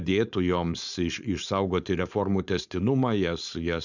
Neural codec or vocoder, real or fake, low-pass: codec, 16 kHz, 4.8 kbps, FACodec; fake; 7.2 kHz